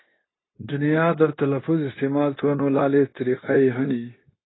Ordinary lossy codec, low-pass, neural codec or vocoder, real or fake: AAC, 16 kbps; 7.2 kHz; codec, 16 kHz, 0.9 kbps, LongCat-Audio-Codec; fake